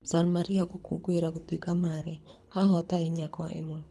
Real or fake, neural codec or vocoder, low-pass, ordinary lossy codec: fake; codec, 24 kHz, 3 kbps, HILCodec; none; none